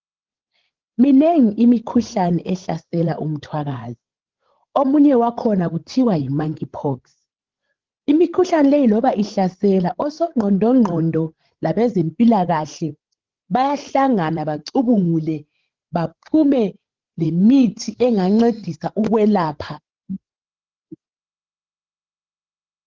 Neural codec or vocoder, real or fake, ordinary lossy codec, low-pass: codec, 16 kHz, 16 kbps, FreqCodec, larger model; fake; Opus, 16 kbps; 7.2 kHz